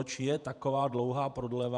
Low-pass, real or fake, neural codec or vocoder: 10.8 kHz; fake; vocoder, 48 kHz, 128 mel bands, Vocos